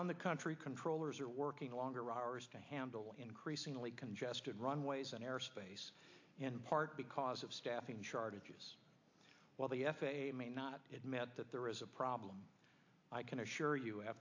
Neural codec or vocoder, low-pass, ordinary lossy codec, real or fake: none; 7.2 kHz; AAC, 48 kbps; real